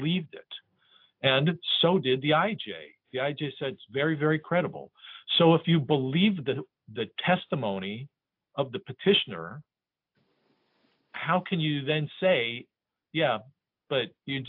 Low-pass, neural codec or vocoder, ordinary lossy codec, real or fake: 5.4 kHz; none; AAC, 48 kbps; real